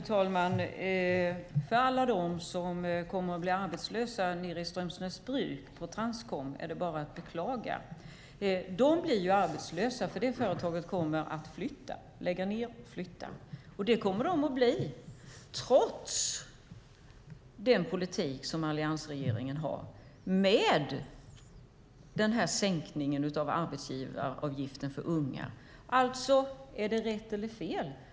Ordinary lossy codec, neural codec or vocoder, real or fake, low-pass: none; none; real; none